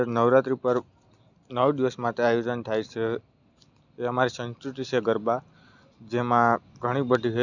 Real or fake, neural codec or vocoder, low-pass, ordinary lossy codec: real; none; 7.2 kHz; none